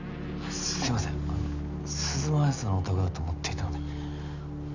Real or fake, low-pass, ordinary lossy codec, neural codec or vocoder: real; 7.2 kHz; none; none